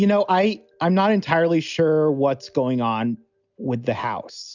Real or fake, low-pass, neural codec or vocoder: real; 7.2 kHz; none